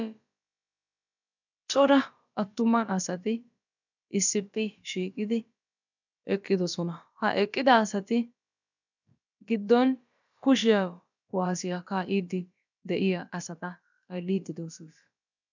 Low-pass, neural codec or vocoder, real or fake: 7.2 kHz; codec, 16 kHz, about 1 kbps, DyCAST, with the encoder's durations; fake